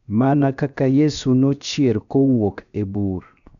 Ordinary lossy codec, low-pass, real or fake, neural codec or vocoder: none; 7.2 kHz; fake; codec, 16 kHz, 0.7 kbps, FocalCodec